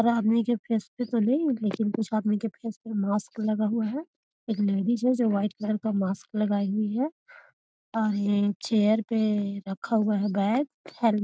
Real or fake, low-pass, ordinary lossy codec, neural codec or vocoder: real; none; none; none